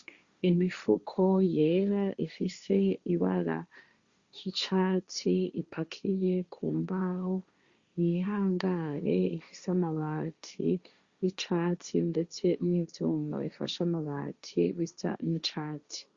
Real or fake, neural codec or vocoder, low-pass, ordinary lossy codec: fake; codec, 16 kHz, 1.1 kbps, Voila-Tokenizer; 7.2 kHz; Opus, 64 kbps